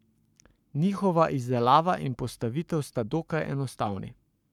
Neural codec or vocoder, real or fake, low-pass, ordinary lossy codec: codec, 44.1 kHz, 7.8 kbps, Pupu-Codec; fake; 19.8 kHz; none